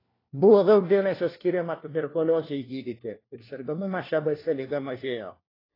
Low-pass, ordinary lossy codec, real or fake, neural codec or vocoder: 5.4 kHz; AAC, 24 kbps; fake; codec, 16 kHz, 1 kbps, FunCodec, trained on LibriTTS, 50 frames a second